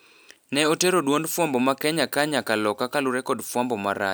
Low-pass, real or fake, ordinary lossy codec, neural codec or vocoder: none; real; none; none